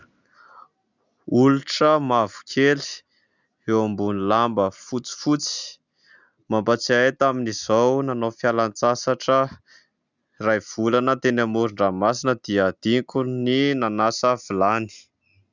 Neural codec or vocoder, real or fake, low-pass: none; real; 7.2 kHz